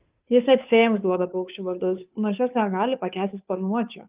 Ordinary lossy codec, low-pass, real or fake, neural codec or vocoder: Opus, 24 kbps; 3.6 kHz; fake; codec, 16 kHz, 2 kbps, FunCodec, trained on Chinese and English, 25 frames a second